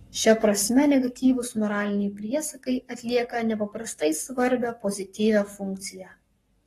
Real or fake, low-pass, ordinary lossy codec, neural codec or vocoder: fake; 19.8 kHz; AAC, 32 kbps; codec, 44.1 kHz, 7.8 kbps, Pupu-Codec